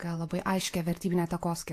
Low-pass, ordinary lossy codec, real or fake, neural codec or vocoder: 14.4 kHz; AAC, 64 kbps; fake; vocoder, 48 kHz, 128 mel bands, Vocos